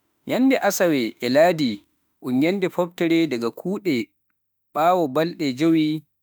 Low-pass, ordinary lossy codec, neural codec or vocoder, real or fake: none; none; autoencoder, 48 kHz, 32 numbers a frame, DAC-VAE, trained on Japanese speech; fake